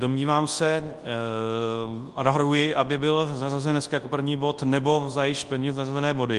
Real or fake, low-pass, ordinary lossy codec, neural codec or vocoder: fake; 10.8 kHz; Opus, 24 kbps; codec, 24 kHz, 0.9 kbps, WavTokenizer, large speech release